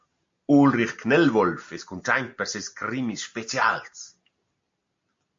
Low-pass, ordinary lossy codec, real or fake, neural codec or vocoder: 7.2 kHz; MP3, 48 kbps; real; none